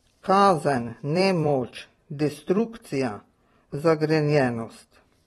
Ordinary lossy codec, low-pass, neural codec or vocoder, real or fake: AAC, 32 kbps; 19.8 kHz; vocoder, 44.1 kHz, 128 mel bands every 256 samples, BigVGAN v2; fake